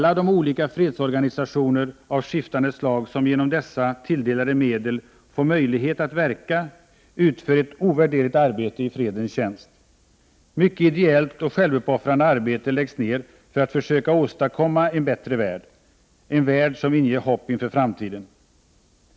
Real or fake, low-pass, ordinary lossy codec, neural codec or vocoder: real; none; none; none